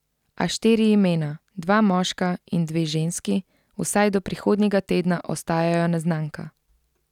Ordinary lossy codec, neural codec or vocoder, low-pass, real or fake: none; none; 19.8 kHz; real